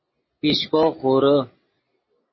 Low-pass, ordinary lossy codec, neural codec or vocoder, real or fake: 7.2 kHz; MP3, 24 kbps; none; real